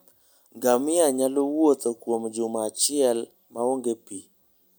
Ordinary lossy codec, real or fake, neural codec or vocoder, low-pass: none; real; none; none